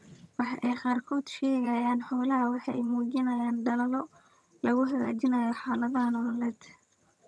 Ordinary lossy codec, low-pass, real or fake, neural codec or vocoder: none; none; fake; vocoder, 22.05 kHz, 80 mel bands, HiFi-GAN